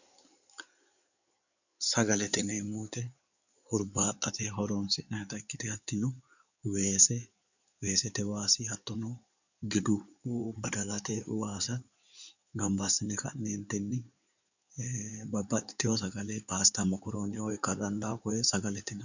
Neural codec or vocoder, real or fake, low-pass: codec, 16 kHz in and 24 kHz out, 2.2 kbps, FireRedTTS-2 codec; fake; 7.2 kHz